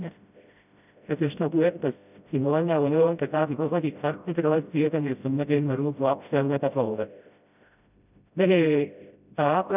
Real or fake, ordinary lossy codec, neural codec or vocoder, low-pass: fake; none; codec, 16 kHz, 0.5 kbps, FreqCodec, smaller model; 3.6 kHz